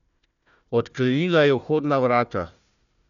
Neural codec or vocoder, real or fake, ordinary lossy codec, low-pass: codec, 16 kHz, 1 kbps, FunCodec, trained on Chinese and English, 50 frames a second; fake; none; 7.2 kHz